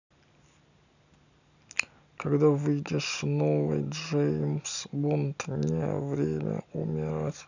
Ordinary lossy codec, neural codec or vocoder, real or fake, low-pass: none; none; real; 7.2 kHz